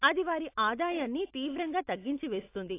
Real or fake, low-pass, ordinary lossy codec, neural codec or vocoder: real; 3.6 kHz; AAC, 24 kbps; none